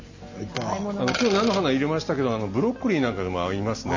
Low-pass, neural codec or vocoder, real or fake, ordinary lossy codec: 7.2 kHz; none; real; MP3, 32 kbps